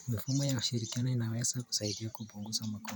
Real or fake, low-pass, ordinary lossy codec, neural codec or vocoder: fake; none; none; vocoder, 44.1 kHz, 128 mel bands every 512 samples, BigVGAN v2